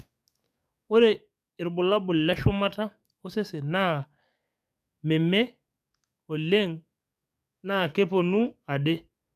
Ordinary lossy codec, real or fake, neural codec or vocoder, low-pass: none; fake; codec, 44.1 kHz, 7.8 kbps, DAC; 14.4 kHz